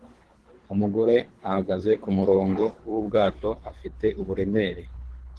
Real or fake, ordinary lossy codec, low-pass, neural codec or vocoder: fake; Opus, 16 kbps; 10.8 kHz; codec, 24 kHz, 3 kbps, HILCodec